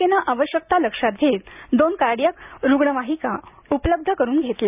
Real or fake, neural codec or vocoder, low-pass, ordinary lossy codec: real; none; 3.6 kHz; none